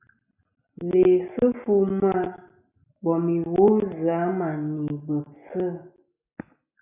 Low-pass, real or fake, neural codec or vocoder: 3.6 kHz; real; none